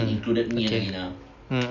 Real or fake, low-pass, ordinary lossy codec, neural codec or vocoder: real; 7.2 kHz; none; none